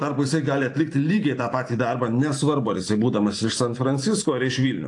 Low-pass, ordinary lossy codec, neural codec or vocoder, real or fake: 10.8 kHz; AAC, 48 kbps; vocoder, 44.1 kHz, 128 mel bands every 512 samples, BigVGAN v2; fake